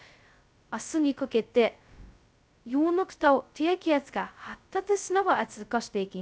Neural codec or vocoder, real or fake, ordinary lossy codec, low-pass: codec, 16 kHz, 0.2 kbps, FocalCodec; fake; none; none